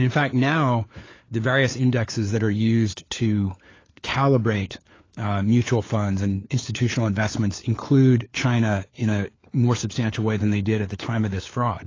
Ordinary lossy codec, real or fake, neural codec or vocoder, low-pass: AAC, 32 kbps; fake; codec, 16 kHz, 4 kbps, FunCodec, trained on LibriTTS, 50 frames a second; 7.2 kHz